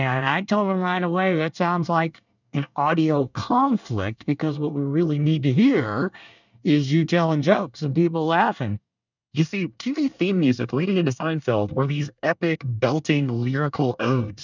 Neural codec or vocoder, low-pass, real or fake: codec, 24 kHz, 1 kbps, SNAC; 7.2 kHz; fake